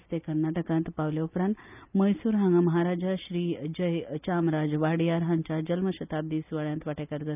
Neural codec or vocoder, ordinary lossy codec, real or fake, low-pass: none; none; real; 3.6 kHz